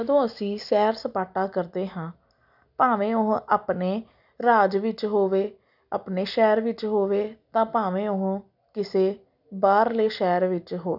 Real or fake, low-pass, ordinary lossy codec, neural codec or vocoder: real; 5.4 kHz; none; none